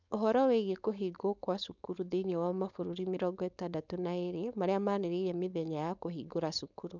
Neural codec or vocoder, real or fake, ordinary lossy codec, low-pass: codec, 16 kHz, 4.8 kbps, FACodec; fake; none; 7.2 kHz